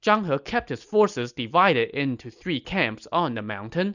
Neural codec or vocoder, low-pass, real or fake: none; 7.2 kHz; real